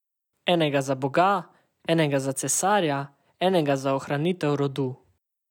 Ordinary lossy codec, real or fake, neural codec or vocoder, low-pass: none; real; none; 19.8 kHz